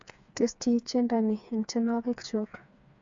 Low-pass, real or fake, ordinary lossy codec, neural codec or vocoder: 7.2 kHz; fake; AAC, 64 kbps; codec, 16 kHz, 4 kbps, FreqCodec, smaller model